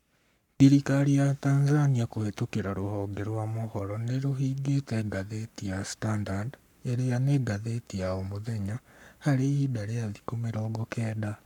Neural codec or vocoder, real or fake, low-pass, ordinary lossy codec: codec, 44.1 kHz, 7.8 kbps, Pupu-Codec; fake; 19.8 kHz; none